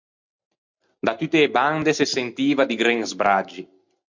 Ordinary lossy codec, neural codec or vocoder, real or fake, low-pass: MP3, 64 kbps; none; real; 7.2 kHz